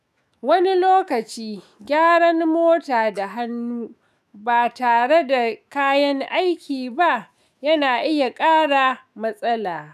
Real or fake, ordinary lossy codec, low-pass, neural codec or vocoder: fake; none; 14.4 kHz; autoencoder, 48 kHz, 128 numbers a frame, DAC-VAE, trained on Japanese speech